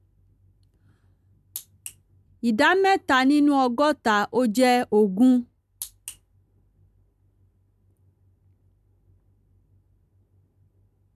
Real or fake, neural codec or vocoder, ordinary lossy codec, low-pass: real; none; none; 14.4 kHz